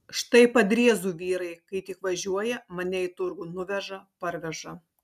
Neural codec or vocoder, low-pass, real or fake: none; 14.4 kHz; real